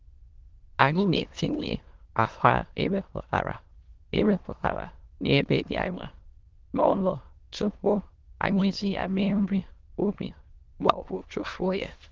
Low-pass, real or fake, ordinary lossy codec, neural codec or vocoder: 7.2 kHz; fake; Opus, 16 kbps; autoencoder, 22.05 kHz, a latent of 192 numbers a frame, VITS, trained on many speakers